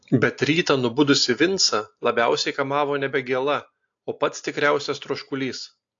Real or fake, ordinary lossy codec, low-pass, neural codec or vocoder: real; AAC, 48 kbps; 7.2 kHz; none